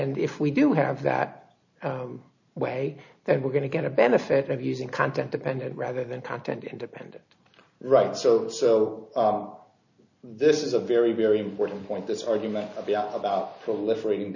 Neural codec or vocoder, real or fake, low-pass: none; real; 7.2 kHz